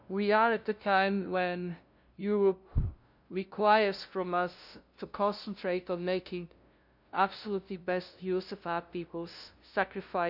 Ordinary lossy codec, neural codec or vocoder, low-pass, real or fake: none; codec, 16 kHz, 0.5 kbps, FunCodec, trained on LibriTTS, 25 frames a second; 5.4 kHz; fake